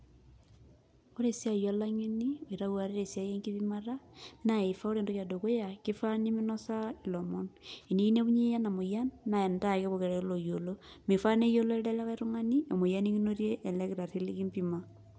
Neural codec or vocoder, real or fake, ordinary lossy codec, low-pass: none; real; none; none